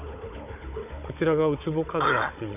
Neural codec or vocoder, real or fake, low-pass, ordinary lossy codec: codec, 16 kHz, 16 kbps, FunCodec, trained on Chinese and English, 50 frames a second; fake; 3.6 kHz; none